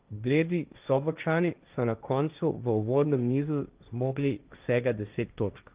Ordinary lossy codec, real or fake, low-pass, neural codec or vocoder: Opus, 24 kbps; fake; 3.6 kHz; codec, 16 kHz, 1.1 kbps, Voila-Tokenizer